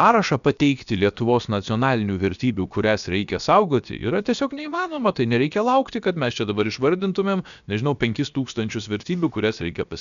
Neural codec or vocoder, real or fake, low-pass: codec, 16 kHz, about 1 kbps, DyCAST, with the encoder's durations; fake; 7.2 kHz